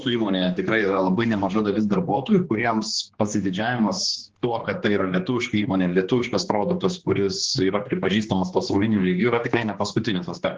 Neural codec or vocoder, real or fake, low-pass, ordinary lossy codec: codec, 16 kHz, 2 kbps, X-Codec, HuBERT features, trained on general audio; fake; 7.2 kHz; Opus, 16 kbps